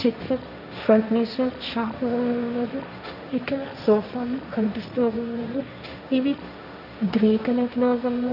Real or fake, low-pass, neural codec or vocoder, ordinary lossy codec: fake; 5.4 kHz; codec, 16 kHz, 1.1 kbps, Voila-Tokenizer; none